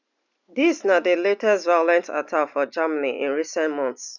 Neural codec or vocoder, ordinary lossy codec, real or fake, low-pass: none; none; real; 7.2 kHz